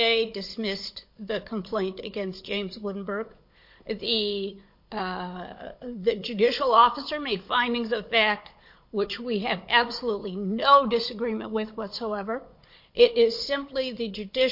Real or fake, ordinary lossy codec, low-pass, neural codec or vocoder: fake; MP3, 32 kbps; 5.4 kHz; codec, 16 kHz, 16 kbps, FunCodec, trained on Chinese and English, 50 frames a second